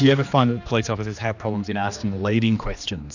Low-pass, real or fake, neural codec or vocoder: 7.2 kHz; fake; codec, 16 kHz, 2 kbps, X-Codec, HuBERT features, trained on general audio